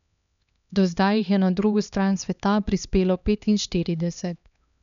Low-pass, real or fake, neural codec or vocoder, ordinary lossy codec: 7.2 kHz; fake; codec, 16 kHz, 2 kbps, X-Codec, HuBERT features, trained on LibriSpeech; none